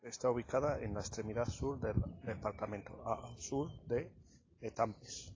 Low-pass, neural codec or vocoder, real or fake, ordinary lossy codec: 7.2 kHz; none; real; AAC, 32 kbps